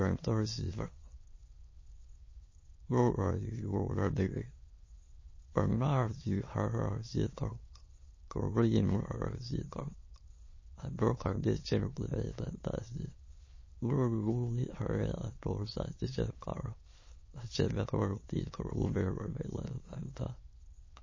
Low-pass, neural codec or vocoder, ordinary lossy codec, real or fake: 7.2 kHz; autoencoder, 22.05 kHz, a latent of 192 numbers a frame, VITS, trained on many speakers; MP3, 32 kbps; fake